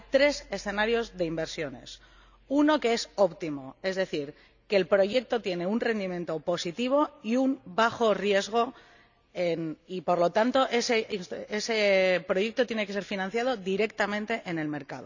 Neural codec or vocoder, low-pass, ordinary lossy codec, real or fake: none; 7.2 kHz; none; real